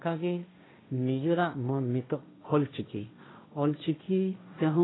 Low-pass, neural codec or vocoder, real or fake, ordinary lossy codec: 7.2 kHz; codec, 16 kHz, 1.1 kbps, Voila-Tokenizer; fake; AAC, 16 kbps